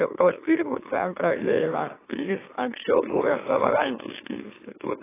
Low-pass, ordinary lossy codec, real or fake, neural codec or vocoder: 3.6 kHz; AAC, 16 kbps; fake; autoencoder, 44.1 kHz, a latent of 192 numbers a frame, MeloTTS